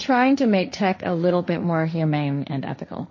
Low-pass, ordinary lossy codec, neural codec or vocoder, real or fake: 7.2 kHz; MP3, 32 kbps; codec, 16 kHz, 1.1 kbps, Voila-Tokenizer; fake